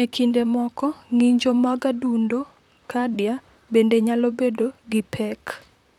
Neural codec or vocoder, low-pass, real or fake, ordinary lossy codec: vocoder, 44.1 kHz, 128 mel bands, Pupu-Vocoder; 19.8 kHz; fake; none